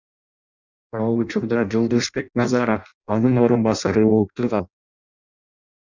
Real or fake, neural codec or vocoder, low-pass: fake; codec, 16 kHz in and 24 kHz out, 0.6 kbps, FireRedTTS-2 codec; 7.2 kHz